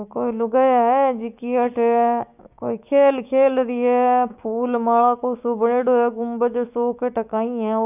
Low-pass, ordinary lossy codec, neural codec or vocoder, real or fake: 3.6 kHz; none; codec, 16 kHz, 6 kbps, DAC; fake